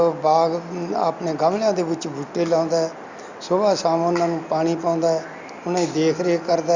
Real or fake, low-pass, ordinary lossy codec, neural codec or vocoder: real; 7.2 kHz; Opus, 64 kbps; none